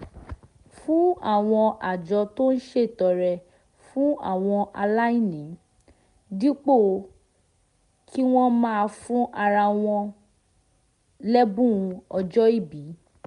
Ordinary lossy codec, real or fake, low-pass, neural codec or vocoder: MP3, 64 kbps; real; 10.8 kHz; none